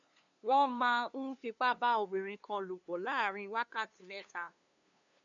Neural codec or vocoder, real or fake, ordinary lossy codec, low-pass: codec, 16 kHz, 2 kbps, FunCodec, trained on LibriTTS, 25 frames a second; fake; MP3, 96 kbps; 7.2 kHz